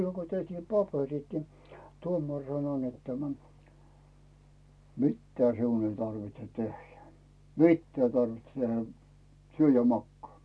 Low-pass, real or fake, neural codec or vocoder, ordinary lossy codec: none; real; none; none